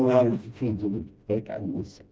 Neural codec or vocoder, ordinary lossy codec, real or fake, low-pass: codec, 16 kHz, 1 kbps, FreqCodec, smaller model; none; fake; none